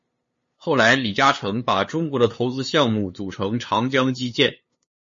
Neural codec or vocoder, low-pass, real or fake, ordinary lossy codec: codec, 16 kHz, 2 kbps, FunCodec, trained on LibriTTS, 25 frames a second; 7.2 kHz; fake; MP3, 32 kbps